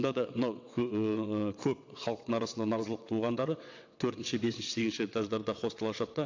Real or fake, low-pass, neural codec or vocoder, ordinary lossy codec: fake; 7.2 kHz; vocoder, 22.05 kHz, 80 mel bands, WaveNeXt; AAC, 48 kbps